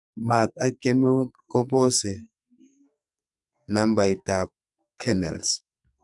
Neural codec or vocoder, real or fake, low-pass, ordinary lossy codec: codec, 44.1 kHz, 2.6 kbps, SNAC; fake; 10.8 kHz; none